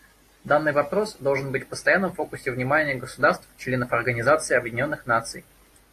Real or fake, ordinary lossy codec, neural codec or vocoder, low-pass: real; AAC, 48 kbps; none; 14.4 kHz